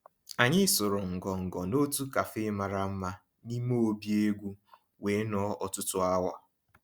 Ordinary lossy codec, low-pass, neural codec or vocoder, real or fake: Opus, 64 kbps; 19.8 kHz; none; real